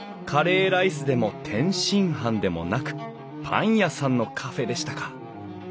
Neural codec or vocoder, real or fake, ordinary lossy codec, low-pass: none; real; none; none